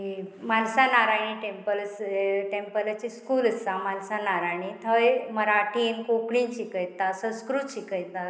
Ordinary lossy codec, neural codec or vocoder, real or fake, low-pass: none; none; real; none